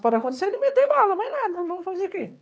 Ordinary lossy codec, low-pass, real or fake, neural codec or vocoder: none; none; fake; codec, 16 kHz, 4 kbps, X-Codec, HuBERT features, trained on LibriSpeech